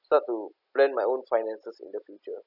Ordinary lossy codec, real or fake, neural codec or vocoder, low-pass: none; real; none; 5.4 kHz